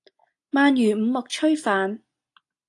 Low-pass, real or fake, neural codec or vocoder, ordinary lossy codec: 10.8 kHz; real; none; AAC, 48 kbps